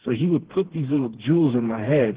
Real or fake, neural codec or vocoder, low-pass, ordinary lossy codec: fake; codec, 16 kHz, 2 kbps, FreqCodec, smaller model; 3.6 kHz; Opus, 16 kbps